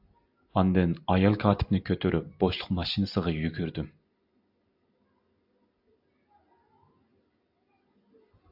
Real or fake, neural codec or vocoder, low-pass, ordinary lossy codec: real; none; 5.4 kHz; AAC, 48 kbps